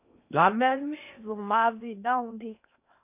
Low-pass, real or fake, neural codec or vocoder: 3.6 kHz; fake; codec, 16 kHz in and 24 kHz out, 0.8 kbps, FocalCodec, streaming, 65536 codes